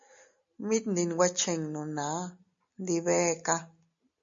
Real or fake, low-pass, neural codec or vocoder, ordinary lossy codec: real; 7.2 kHz; none; MP3, 48 kbps